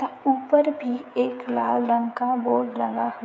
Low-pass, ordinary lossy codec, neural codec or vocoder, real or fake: none; none; codec, 16 kHz, 16 kbps, FreqCodec, smaller model; fake